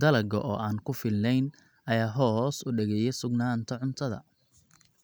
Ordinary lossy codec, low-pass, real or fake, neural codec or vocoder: none; none; real; none